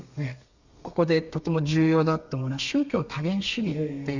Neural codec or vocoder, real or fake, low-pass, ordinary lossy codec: codec, 32 kHz, 1.9 kbps, SNAC; fake; 7.2 kHz; Opus, 64 kbps